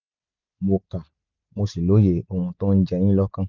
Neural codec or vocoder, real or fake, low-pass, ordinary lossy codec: none; real; 7.2 kHz; none